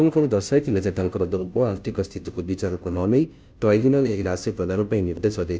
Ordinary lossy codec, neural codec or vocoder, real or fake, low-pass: none; codec, 16 kHz, 0.5 kbps, FunCodec, trained on Chinese and English, 25 frames a second; fake; none